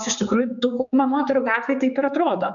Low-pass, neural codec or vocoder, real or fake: 7.2 kHz; codec, 16 kHz, 4 kbps, X-Codec, HuBERT features, trained on general audio; fake